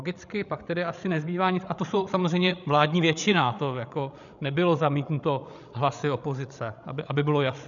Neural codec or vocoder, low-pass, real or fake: codec, 16 kHz, 16 kbps, FreqCodec, larger model; 7.2 kHz; fake